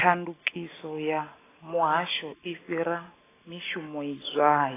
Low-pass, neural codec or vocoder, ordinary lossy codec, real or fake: 3.6 kHz; none; AAC, 16 kbps; real